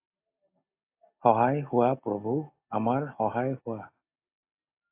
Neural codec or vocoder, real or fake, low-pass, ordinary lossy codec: none; real; 3.6 kHz; AAC, 24 kbps